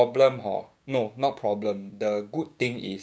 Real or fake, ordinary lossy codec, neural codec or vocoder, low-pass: fake; none; codec, 16 kHz, 6 kbps, DAC; none